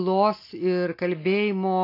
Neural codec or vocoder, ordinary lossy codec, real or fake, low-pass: none; AAC, 32 kbps; real; 5.4 kHz